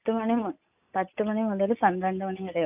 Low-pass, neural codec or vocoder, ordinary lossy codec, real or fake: 3.6 kHz; none; AAC, 32 kbps; real